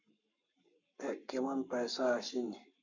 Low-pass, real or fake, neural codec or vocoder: 7.2 kHz; fake; codec, 44.1 kHz, 7.8 kbps, Pupu-Codec